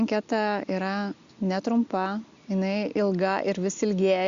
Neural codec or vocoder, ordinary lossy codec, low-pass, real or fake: none; Opus, 64 kbps; 7.2 kHz; real